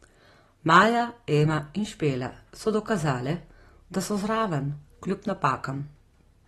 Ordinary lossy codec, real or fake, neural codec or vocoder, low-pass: AAC, 32 kbps; real; none; 19.8 kHz